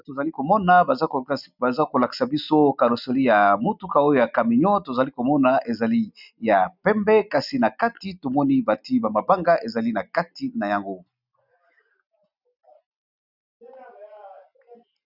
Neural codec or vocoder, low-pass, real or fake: none; 5.4 kHz; real